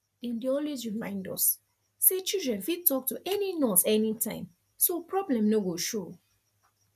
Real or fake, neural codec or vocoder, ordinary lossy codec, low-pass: real; none; none; 14.4 kHz